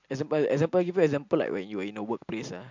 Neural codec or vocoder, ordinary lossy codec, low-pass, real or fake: none; MP3, 64 kbps; 7.2 kHz; real